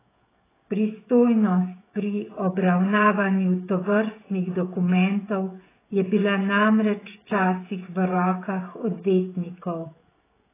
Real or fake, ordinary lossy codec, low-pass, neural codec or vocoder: fake; AAC, 16 kbps; 3.6 kHz; codec, 16 kHz, 16 kbps, FreqCodec, smaller model